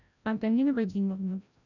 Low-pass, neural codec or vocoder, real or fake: 7.2 kHz; codec, 16 kHz, 0.5 kbps, FreqCodec, larger model; fake